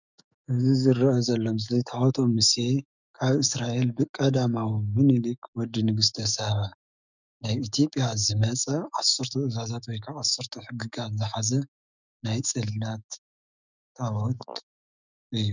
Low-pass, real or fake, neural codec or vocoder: 7.2 kHz; fake; autoencoder, 48 kHz, 128 numbers a frame, DAC-VAE, trained on Japanese speech